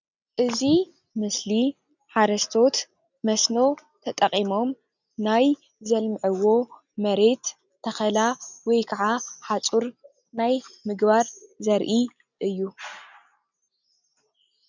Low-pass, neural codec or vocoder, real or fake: 7.2 kHz; none; real